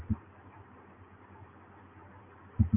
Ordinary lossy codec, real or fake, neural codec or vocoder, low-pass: MP3, 24 kbps; real; none; 3.6 kHz